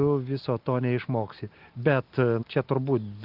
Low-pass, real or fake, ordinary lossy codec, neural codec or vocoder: 5.4 kHz; real; Opus, 32 kbps; none